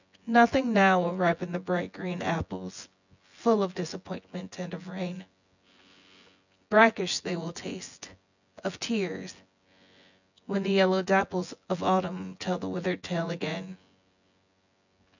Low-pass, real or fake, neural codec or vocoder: 7.2 kHz; fake; vocoder, 24 kHz, 100 mel bands, Vocos